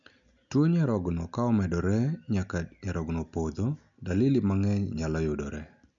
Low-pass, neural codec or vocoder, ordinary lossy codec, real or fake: 7.2 kHz; none; none; real